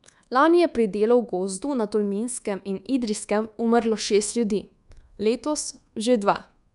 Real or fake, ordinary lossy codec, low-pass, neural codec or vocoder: fake; none; 10.8 kHz; codec, 24 kHz, 1.2 kbps, DualCodec